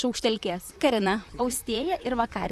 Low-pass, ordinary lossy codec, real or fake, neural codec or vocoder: 14.4 kHz; Opus, 64 kbps; fake; vocoder, 44.1 kHz, 128 mel bands, Pupu-Vocoder